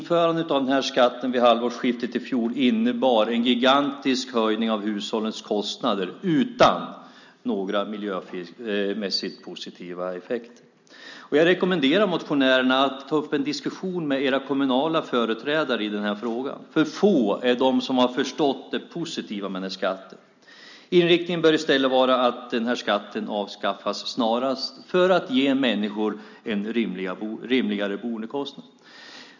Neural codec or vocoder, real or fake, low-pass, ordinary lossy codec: none; real; 7.2 kHz; none